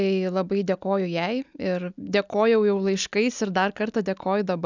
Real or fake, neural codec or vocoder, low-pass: real; none; 7.2 kHz